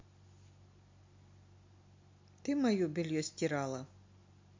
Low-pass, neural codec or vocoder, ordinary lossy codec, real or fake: 7.2 kHz; none; MP3, 48 kbps; real